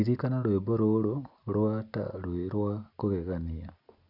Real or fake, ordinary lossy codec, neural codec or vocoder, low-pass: real; none; none; 5.4 kHz